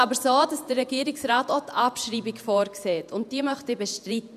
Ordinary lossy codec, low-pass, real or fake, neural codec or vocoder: none; 14.4 kHz; fake; vocoder, 48 kHz, 128 mel bands, Vocos